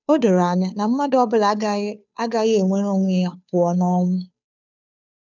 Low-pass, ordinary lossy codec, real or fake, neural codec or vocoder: 7.2 kHz; none; fake; codec, 16 kHz, 2 kbps, FunCodec, trained on Chinese and English, 25 frames a second